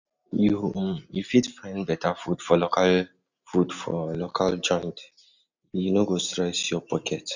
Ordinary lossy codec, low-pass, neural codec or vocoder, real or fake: none; 7.2 kHz; none; real